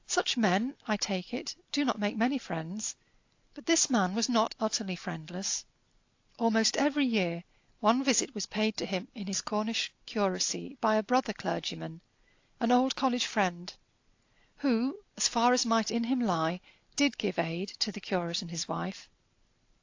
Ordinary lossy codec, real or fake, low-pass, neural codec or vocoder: AAC, 48 kbps; real; 7.2 kHz; none